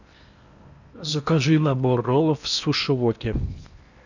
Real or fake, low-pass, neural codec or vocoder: fake; 7.2 kHz; codec, 16 kHz in and 24 kHz out, 0.8 kbps, FocalCodec, streaming, 65536 codes